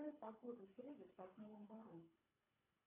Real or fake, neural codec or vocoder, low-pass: fake; codec, 24 kHz, 3 kbps, HILCodec; 3.6 kHz